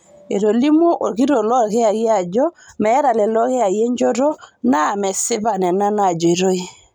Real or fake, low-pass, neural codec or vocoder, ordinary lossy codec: real; 19.8 kHz; none; none